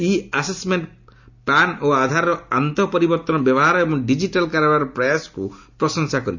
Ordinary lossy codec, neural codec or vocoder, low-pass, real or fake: none; none; 7.2 kHz; real